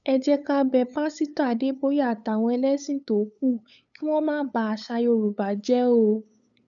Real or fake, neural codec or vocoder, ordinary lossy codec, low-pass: fake; codec, 16 kHz, 8 kbps, FunCodec, trained on LibriTTS, 25 frames a second; none; 7.2 kHz